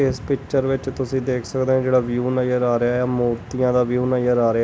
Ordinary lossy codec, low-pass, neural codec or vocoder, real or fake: none; none; none; real